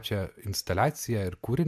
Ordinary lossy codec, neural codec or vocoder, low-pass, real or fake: MP3, 96 kbps; none; 14.4 kHz; real